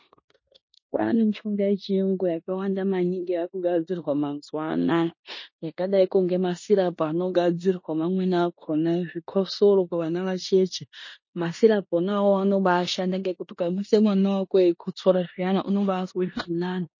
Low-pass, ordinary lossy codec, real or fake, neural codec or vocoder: 7.2 kHz; MP3, 32 kbps; fake; codec, 16 kHz in and 24 kHz out, 0.9 kbps, LongCat-Audio-Codec, four codebook decoder